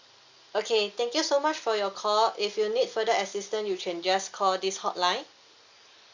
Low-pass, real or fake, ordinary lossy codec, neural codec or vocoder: 7.2 kHz; real; Opus, 64 kbps; none